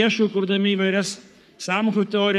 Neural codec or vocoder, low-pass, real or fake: codec, 44.1 kHz, 3.4 kbps, Pupu-Codec; 14.4 kHz; fake